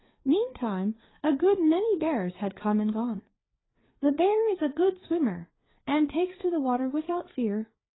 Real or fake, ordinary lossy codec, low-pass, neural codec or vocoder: fake; AAC, 16 kbps; 7.2 kHz; codec, 16 kHz, 4 kbps, FreqCodec, larger model